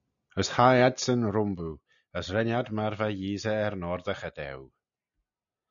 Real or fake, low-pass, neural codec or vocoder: real; 7.2 kHz; none